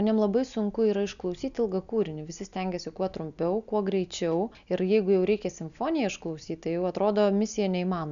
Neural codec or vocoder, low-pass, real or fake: none; 7.2 kHz; real